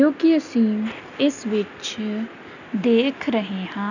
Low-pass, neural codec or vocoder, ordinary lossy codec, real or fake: 7.2 kHz; none; none; real